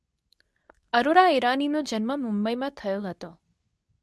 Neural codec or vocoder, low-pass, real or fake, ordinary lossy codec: codec, 24 kHz, 0.9 kbps, WavTokenizer, medium speech release version 2; none; fake; none